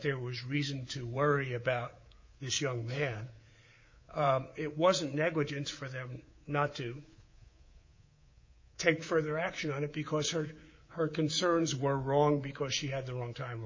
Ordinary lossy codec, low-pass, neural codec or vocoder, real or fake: MP3, 32 kbps; 7.2 kHz; codec, 24 kHz, 3.1 kbps, DualCodec; fake